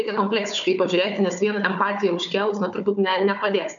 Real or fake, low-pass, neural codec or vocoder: fake; 7.2 kHz; codec, 16 kHz, 4 kbps, FunCodec, trained on Chinese and English, 50 frames a second